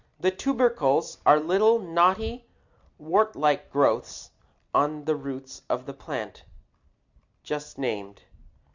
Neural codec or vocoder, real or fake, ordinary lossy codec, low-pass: none; real; Opus, 64 kbps; 7.2 kHz